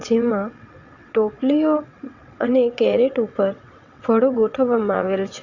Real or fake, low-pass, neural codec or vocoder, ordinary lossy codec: fake; 7.2 kHz; vocoder, 22.05 kHz, 80 mel bands, WaveNeXt; Opus, 64 kbps